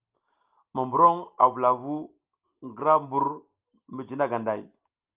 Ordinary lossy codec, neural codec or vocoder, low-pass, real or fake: Opus, 32 kbps; none; 3.6 kHz; real